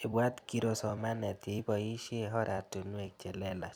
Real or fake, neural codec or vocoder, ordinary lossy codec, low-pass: fake; vocoder, 44.1 kHz, 128 mel bands every 256 samples, BigVGAN v2; none; none